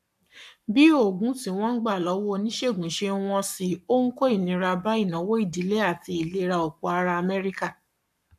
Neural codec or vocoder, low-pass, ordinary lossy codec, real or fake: codec, 44.1 kHz, 7.8 kbps, Pupu-Codec; 14.4 kHz; AAC, 96 kbps; fake